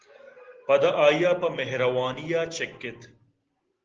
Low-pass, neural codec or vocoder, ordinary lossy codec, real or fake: 7.2 kHz; none; Opus, 16 kbps; real